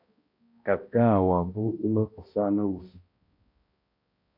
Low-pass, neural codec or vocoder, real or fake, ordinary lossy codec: 5.4 kHz; codec, 16 kHz, 0.5 kbps, X-Codec, HuBERT features, trained on balanced general audio; fake; AAC, 32 kbps